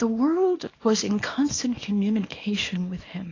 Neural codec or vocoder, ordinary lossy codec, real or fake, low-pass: codec, 24 kHz, 0.9 kbps, WavTokenizer, small release; AAC, 32 kbps; fake; 7.2 kHz